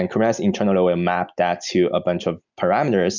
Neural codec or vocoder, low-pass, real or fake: none; 7.2 kHz; real